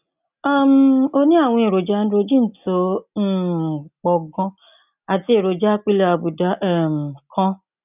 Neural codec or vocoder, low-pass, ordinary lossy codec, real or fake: none; 3.6 kHz; none; real